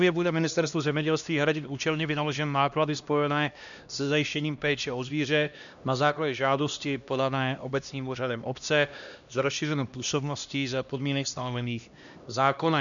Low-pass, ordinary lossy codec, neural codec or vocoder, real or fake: 7.2 kHz; MP3, 96 kbps; codec, 16 kHz, 1 kbps, X-Codec, HuBERT features, trained on LibriSpeech; fake